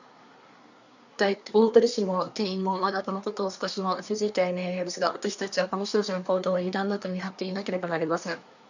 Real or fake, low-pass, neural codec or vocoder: fake; 7.2 kHz; codec, 24 kHz, 1 kbps, SNAC